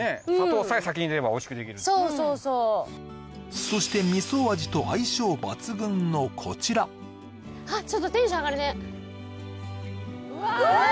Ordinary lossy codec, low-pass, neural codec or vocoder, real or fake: none; none; none; real